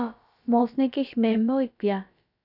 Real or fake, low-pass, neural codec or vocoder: fake; 5.4 kHz; codec, 16 kHz, about 1 kbps, DyCAST, with the encoder's durations